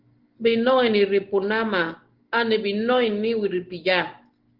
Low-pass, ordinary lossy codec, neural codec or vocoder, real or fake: 5.4 kHz; Opus, 16 kbps; none; real